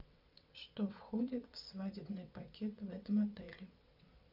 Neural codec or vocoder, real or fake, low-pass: vocoder, 22.05 kHz, 80 mel bands, WaveNeXt; fake; 5.4 kHz